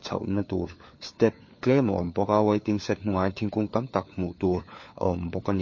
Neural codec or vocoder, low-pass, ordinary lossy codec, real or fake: codec, 16 kHz, 4 kbps, FunCodec, trained on LibriTTS, 50 frames a second; 7.2 kHz; MP3, 32 kbps; fake